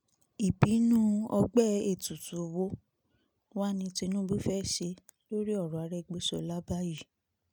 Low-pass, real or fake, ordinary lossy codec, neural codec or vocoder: none; real; none; none